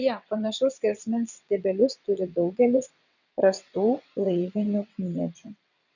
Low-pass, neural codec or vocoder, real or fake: 7.2 kHz; vocoder, 22.05 kHz, 80 mel bands, WaveNeXt; fake